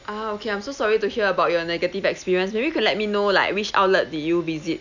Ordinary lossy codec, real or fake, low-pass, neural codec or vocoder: none; real; 7.2 kHz; none